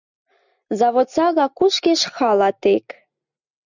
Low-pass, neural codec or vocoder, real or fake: 7.2 kHz; none; real